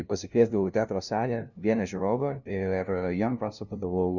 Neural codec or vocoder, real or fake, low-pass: codec, 16 kHz, 0.5 kbps, FunCodec, trained on LibriTTS, 25 frames a second; fake; 7.2 kHz